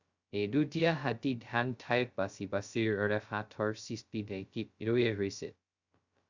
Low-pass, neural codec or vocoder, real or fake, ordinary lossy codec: 7.2 kHz; codec, 16 kHz, 0.2 kbps, FocalCodec; fake; Opus, 64 kbps